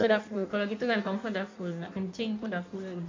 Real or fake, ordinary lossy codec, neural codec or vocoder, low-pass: fake; MP3, 48 kbps; codec, 16 kHz in and 24 kHz out, 1.1 kbps, FireRedTTS-2 codec; 7.2 kHz